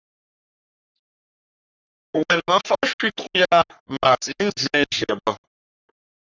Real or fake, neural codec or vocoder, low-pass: fake; codec, 44.1 kHz, 3.4 kbps, Pupu-Codec; 7.2 kHz